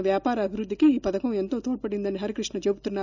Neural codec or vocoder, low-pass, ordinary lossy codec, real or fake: none; 7.2 kHz; none; real